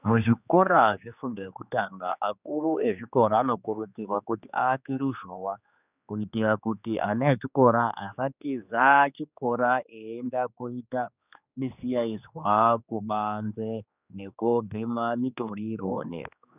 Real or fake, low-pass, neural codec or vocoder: fake; 3.6 kHz; codec, 16 kHz, 2 kbps, X-Codec, HuBERT features, trained on general audio